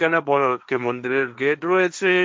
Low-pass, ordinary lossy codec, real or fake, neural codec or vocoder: none; none; fake; codec, 16 kHz, 1.1 kbps, Voila-Tokenizer